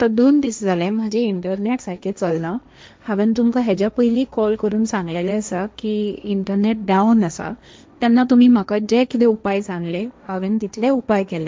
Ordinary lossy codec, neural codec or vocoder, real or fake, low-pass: none; codec, 16 kHz, 1.1 kbps, Voila-Tokenizer; fake; none